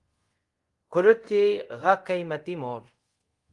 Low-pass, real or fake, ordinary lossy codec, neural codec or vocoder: 10.8 kHz; fake; Opus, 24 kbps; codec, 24 kHz, 0.5 kbps, DualCodec